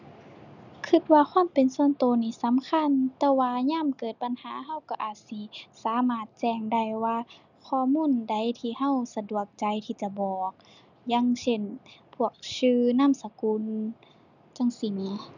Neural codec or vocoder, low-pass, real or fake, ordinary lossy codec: none; 7.2 kHz; real; none